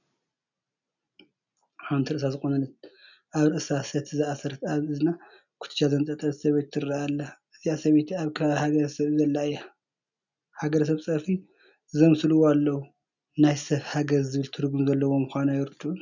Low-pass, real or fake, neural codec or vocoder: 7.2 kHz; real; none